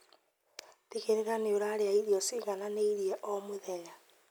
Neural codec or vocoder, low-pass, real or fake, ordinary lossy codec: none; none; real; none